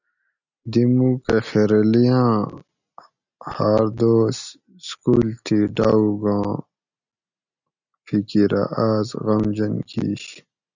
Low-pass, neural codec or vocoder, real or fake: 7.2 kHz; none; real